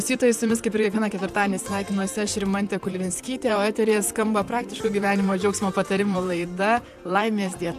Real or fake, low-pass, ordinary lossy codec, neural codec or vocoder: fake; 14.4 kHz; AAC, 96 kbps; vocoder, 44.1 kHz, 128 mel bands, Pupu-Vocoder